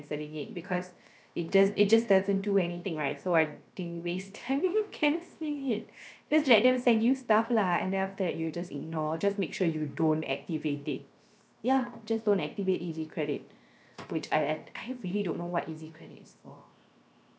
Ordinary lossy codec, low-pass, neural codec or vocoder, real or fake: none; none; codec, 16 kHz, 0.7 kbps, FocalCodec; fake